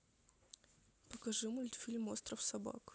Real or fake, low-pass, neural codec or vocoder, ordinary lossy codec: real; none; none; none